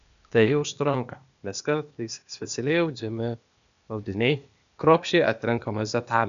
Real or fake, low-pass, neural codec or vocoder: fake; 7.2 kHz; codec, 16 kHz, 0.8 kbps, ZipCodec